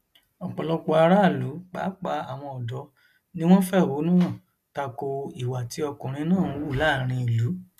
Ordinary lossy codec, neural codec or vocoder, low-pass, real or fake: none; none; 14.4 kHz; real